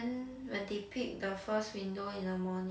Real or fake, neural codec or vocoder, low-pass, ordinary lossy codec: real; none; none; none